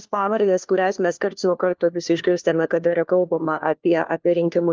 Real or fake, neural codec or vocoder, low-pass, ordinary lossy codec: fake; codec, 16 kHz, 1 kbps, FunCodec, trained on LibriTTS, 50 frames a second; 7.2 kHz; Opus, 32 kbps